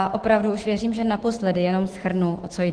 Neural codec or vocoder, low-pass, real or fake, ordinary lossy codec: autoencoder, 48 kHz, 128 numbers a frame, DAC-VAE, trained on Japanese speech; 9.9 kHz; fake; Opus, 16 kbps